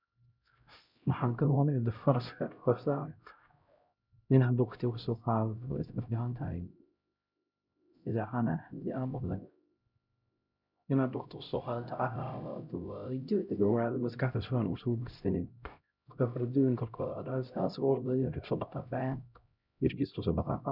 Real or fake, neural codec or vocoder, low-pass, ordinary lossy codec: fake; codec, 16 kHz, 0.5 kbps, X-Codec, HuBERT features, trained on LibriSpeech; 5.4 kHz; none